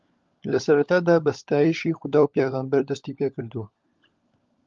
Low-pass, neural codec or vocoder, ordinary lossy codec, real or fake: 7.2 kHz; codec, 16 kHz, 16 kbps, FunCodec, trained on LibriTTS, 50 frames a second; Opus, 32 kbps; fake